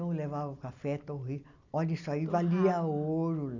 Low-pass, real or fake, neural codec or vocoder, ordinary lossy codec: 7.2 kHz; real; none; none